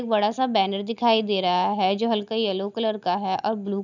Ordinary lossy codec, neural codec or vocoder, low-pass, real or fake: none; none; 7.2 kHz; real